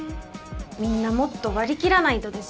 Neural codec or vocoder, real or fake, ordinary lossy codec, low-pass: none; real; none; none